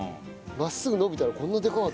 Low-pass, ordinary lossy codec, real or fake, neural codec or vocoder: none; none; real; none